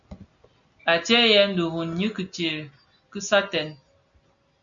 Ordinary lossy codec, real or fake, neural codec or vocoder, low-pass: MP3, 96 kbps; real; none; 7.2 kHz